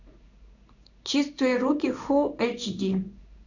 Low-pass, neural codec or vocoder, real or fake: 7.2 kHz; codec, 16 kHz in and 24 kHz out, 1 kbps, XY-Tokenizer; fake